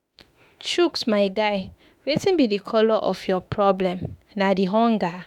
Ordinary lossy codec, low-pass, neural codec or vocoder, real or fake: none; 19.8 kHz; autoencoder, 48 kHz, 32 numbers a frame, DAC-VAE, trained on Japanese speech; fake